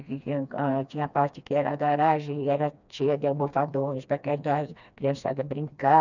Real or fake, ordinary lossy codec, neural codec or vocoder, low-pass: fake; none; codec, 16 kHz, 2 kbps, FreqCodec, smaller model; 7.2 kHz